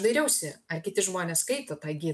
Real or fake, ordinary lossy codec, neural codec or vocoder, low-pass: real; MP3, 96 kbps; none; 14.4 kHz